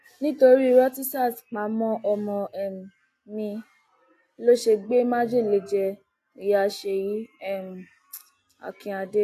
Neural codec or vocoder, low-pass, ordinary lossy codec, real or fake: none; 14.4 kHz; AAC, 64 kbps; real